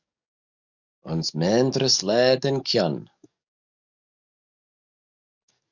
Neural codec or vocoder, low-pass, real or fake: codec, 44.1 kHz, 7.8 kbps, DAC; 7.2 kHz; fake